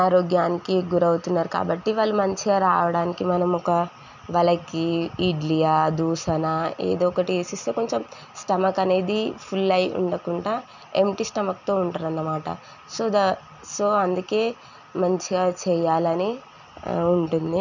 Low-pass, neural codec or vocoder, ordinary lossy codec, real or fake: 7.2 kHz; none; none; real